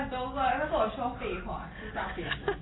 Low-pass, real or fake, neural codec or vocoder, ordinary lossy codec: 7.2 kHz; real; none; AAC, 16 kbps